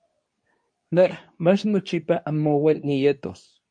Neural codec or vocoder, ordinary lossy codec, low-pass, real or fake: codec, 24 kHz, 0.9 kbps, WavTokenizer, medium speech release version 2; MP3, 48 kbps; 9.9 kHz; fake